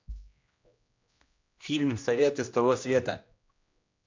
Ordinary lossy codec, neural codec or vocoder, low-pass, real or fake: MP3, 64 kbps; codec, 16 kHz, 1 kbps, X-Codec, HuBERT features, trained on general audio; 7.2 kHz; fake